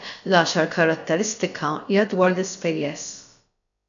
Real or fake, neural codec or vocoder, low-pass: fake; codec, 16 kHz, about 1 kbps, DyCAST, with the encoder's durations; 7.2 kHz